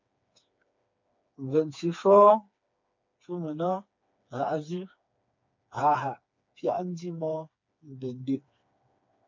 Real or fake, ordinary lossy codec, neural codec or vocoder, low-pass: fake; MP3, 48 kbps; codec, 16 kHz, 4 kbps, FreqCodec, smaller model; 7.2 kHz